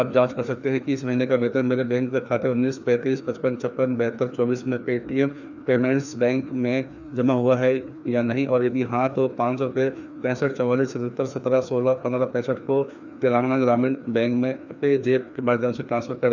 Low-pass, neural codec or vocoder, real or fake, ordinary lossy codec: 7.2 kHz; codec, 16 kHz, 2 kbps, FreqCodec, larger model; fake; none